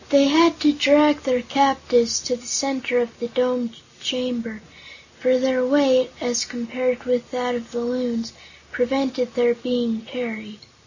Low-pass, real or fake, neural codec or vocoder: 7.2 kHz; real; none